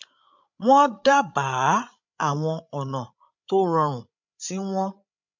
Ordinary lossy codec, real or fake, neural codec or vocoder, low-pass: MP3, 64 kbps; fake; codec, 16 kHz, 8 kbps, FreqCodec, larger model; 7.2 kHz